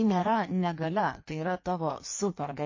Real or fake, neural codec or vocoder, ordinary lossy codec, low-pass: fake; codec, 16 kHz in and 24 kHz out, 1.1 kbps, FireRedTTS-2 codec; MP3, 32 kbps; 7.2 kHz